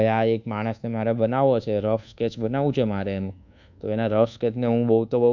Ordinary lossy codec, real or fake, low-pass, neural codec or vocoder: none; fake; 7.2 kHz; codec, 24 kHz, 1.2 kbps, DualCodec